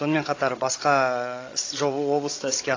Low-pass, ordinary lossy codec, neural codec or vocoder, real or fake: 7.2 kHz; AAC, 32 kbps; codec, 16 kHz, 16 kbps, FreqCodec, larger model; fake